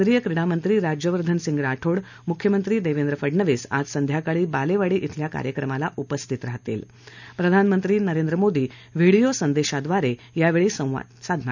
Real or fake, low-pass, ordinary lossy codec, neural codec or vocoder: real; 7.2 kHz; none; none